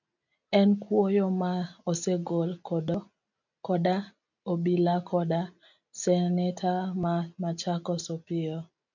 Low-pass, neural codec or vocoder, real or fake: 7.2 kHz; none; real